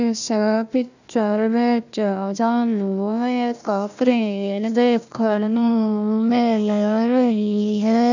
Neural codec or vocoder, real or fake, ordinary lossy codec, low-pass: codec, 16 kHz, 1 kbps, FunCodec, trained on Chinese and English, 50 frames a second; fake; none; 7.2 kHz